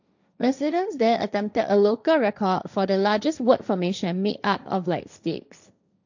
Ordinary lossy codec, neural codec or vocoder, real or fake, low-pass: none; codec, 16 kHz, 1.1 kbps, Voila-Tokenizer; fake; 7.2 kHz